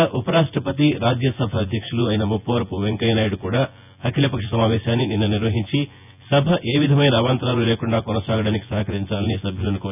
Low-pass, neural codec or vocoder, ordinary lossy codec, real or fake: 3.6 kHz; vocoder, 24 kHz, 100 mel bands, Vocos; none; fake